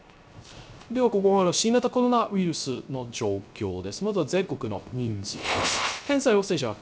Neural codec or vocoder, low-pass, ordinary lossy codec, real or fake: codec, 16 kHz, 0.3 kbps, FocalCodec; none; none; fake